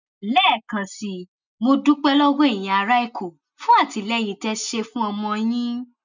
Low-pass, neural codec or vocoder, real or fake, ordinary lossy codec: 7.2 kHz; none; real; none